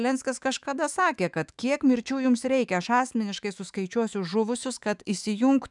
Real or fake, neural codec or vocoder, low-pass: fake; autoencoder, 48 kHz, 128 numbers a frame, DAC-VAE, trained on Japanese speech; 10.8 kHz